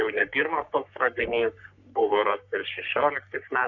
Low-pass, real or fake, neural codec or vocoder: 7.2 kHz; fake; codec, 44.1 kHz, 3.4 kbps, Pupu-Codec